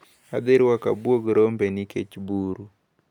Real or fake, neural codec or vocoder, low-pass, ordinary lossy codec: real; none; 19.8 kHz; none